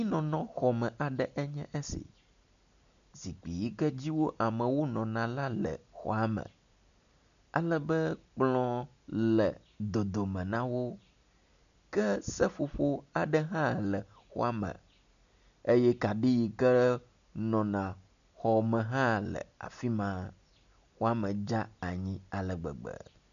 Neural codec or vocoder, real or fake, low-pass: none; real; 7.2 kHz